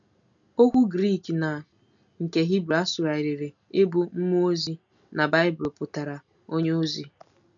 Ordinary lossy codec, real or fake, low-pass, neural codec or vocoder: none; real; 7.2 kHz; none